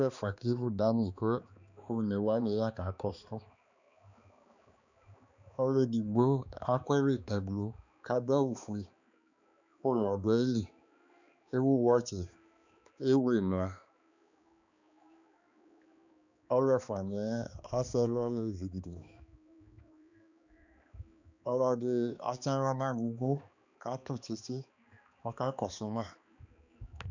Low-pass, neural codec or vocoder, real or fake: 7.2 kHz; codec, 16 kHz, 2 kbps, X-Codec, HuBERT features, trained on balanced general audio; fake